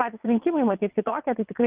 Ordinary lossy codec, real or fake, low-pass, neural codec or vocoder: Opus, 24 kbps; fake; 3.6 kHz; vocoder, 22.05 kHz, 80 mel bands, WaveNeXt